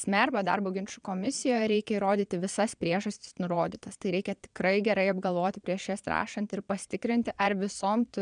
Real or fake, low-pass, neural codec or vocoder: fake; 9.9 kHz; vocoder, 22.05 kHz, 80 mel bands, Vocos